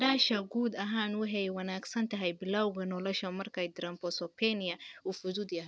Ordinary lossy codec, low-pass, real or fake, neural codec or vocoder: none; none; real; none